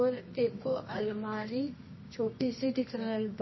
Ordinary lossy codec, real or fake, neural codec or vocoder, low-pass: MP3, 24 kbps; fake; codec, 24 kHz, 0.9 kbps, WavTokenizer, medium music audio release; 7.2 kHz